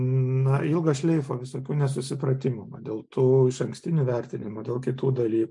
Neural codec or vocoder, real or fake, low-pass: none; real; 10.8 kHz